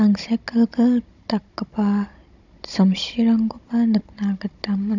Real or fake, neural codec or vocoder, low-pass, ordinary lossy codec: fake; codec, 16 kHz, 16 kbps, FunCodec, trained on Chinese and English, 50 frames a second; 7.2 kHz; none